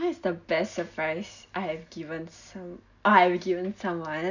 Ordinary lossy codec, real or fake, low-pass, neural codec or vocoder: none; real; 7.2 kHz; none